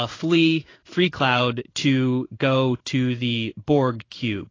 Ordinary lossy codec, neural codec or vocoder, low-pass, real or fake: AAC, 32 kbps; codec, 16 kHz in and 24 kHz out, 1 kbps, XY-Tokenizer; 7.2 kHz; fake